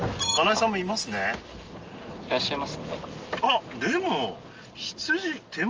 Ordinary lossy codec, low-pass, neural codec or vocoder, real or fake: Opus, 24 kbps; 7.2 kHz; none; real